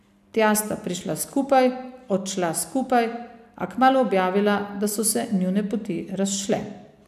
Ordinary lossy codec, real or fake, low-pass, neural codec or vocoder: AAC, 96 kbps; real; 14.4 kHz; none